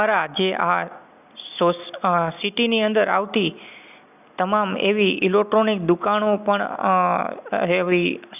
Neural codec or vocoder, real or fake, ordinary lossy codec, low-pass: none; real; none; 3.6 kHz